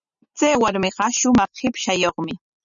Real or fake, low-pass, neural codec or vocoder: real; 7.2 kHz; none